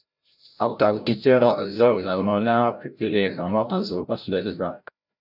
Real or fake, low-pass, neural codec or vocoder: fake; 5.4 kHz; codec, 16 kHz, 0.5 kbps, FreqCodec, larger model